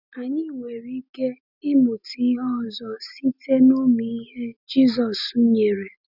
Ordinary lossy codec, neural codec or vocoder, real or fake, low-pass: none; none; real; 5.4 kHz